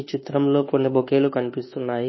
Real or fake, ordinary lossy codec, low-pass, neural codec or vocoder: fake; MP3, 24 kbps; 7.2 kHz; codec, 24 kHz, 1.2 kbps, DualCodec